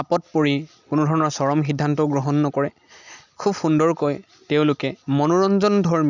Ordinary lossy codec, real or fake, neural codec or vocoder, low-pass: none; real; none; 7.2 kHz